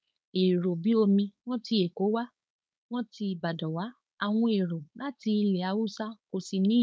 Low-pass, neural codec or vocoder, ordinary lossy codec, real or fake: none; codec, 16 kHz, 4.8 kbps, FACodec; none; fake